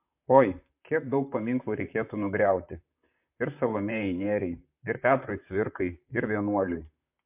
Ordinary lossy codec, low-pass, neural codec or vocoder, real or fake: MP3, 32 kbps; 3.6 kHz; vocoder, 44.1 kHz, 128 mel bands, Pupu-Vocoder; fake